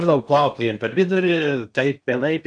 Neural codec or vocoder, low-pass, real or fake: codec, 16 kHz in and 24 kHz out, 0.8 kbps, FocalCodec, streaming, 65536 codes; 9.9 kHz; fake